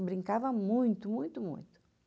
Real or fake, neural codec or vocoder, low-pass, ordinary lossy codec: real; none; none; none